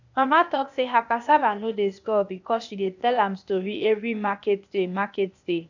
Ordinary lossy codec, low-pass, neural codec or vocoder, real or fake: none; 7.2 kHz; codec, 16 kHz, 0.8 kbps, ZipCodec; fake